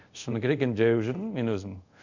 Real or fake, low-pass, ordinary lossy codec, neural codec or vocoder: fake; 7.2 kHz; none; codec, 24 kHz, 0.5 kbps, DualCodec